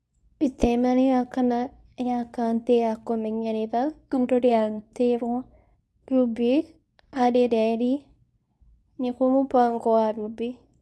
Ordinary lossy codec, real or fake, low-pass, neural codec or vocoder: none; fake; none; codec, 24 kHz, 0.9 kbps, WavTokenizer, medium speech release version 2